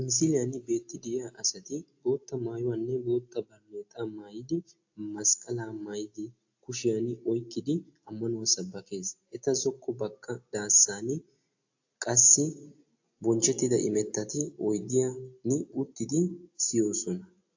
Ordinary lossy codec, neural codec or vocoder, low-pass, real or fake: AAC, 48 kbps; none; 7.2 kHz; real